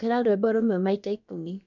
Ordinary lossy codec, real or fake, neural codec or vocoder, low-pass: none; fake; codec, 16 kHz, about 1 kbps, DyCAST, with the encoder's durations; 7.2 kHz